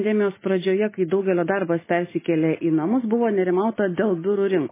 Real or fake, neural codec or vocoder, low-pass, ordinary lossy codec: real; none; 3.6 kHz; MP3, 16 kbps